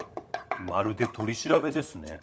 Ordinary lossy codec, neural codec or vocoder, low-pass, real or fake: none; codec, 16 kHz, 16 kbps, FunCodec, trained on LibriTTS, 50 frames a second; none; fake